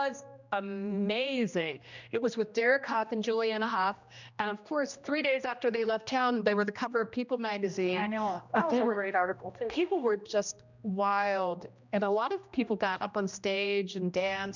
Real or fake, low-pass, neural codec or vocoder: fake; 7.2 kHz; codec, 16 kHz, 1 kbps, X-Codec, HuBERT features, trained on general audio